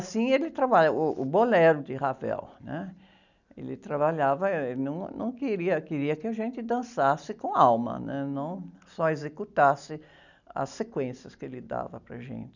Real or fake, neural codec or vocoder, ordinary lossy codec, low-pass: real; none; none; 7.2 kHz